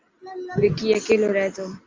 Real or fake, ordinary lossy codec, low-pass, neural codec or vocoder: real; Opus, 24 kbps; 7.2 kHz; none